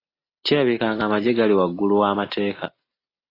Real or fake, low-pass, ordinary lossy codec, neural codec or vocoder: real; 5.4 kHz; AAC, 24 kbps; none